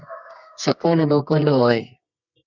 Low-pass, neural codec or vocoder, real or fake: 7.2 kHz; codec, 24 kHz, 0.9 kbps, WavTokenizer, medium music audio release; fake